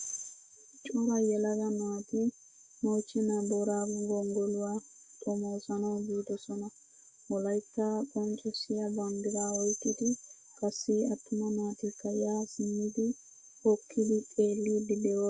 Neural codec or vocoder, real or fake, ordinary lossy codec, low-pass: autoencoder, 48 kHz, 128 numbers a frame, DAC-VAE, trained on Japanese speech; fake; Opus, 64 kbps; 9.9 kHz